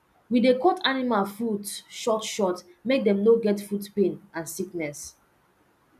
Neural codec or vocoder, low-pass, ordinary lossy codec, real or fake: none; 14.4 kHz; none; real